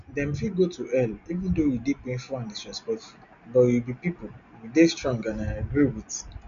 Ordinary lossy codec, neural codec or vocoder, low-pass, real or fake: none; none; 7.2 kHz; real